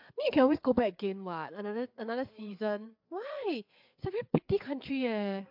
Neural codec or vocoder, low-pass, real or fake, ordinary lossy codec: codec, 16 kHz, 4 kbps, FreqCodec, larger model; 5.4 kHz; fake; MP3, 48 kbps